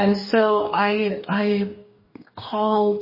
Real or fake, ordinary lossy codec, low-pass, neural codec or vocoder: fake; MP3, 24 kbps; 5.4 kHz; codec, 44.1 kHz, 2.6 kbps, DAC